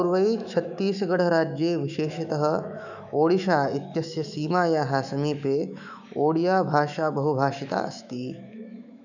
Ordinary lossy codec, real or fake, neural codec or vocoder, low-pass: none; fake; autoencoder, 48 kHz, 128 numbers a frame, DAC-VAE, trained on Japanese speech; 7.2 kHz